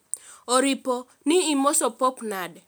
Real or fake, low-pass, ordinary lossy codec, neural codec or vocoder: real; none; none; none